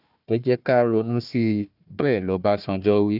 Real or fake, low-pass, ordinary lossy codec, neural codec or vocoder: fake; 5.4 kHz; none; codec, 16 kHz, 1 kbps, FunCodec, trained on Chinese and English, 50 frames a second